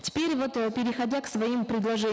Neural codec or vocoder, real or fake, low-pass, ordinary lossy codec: none; real; none; none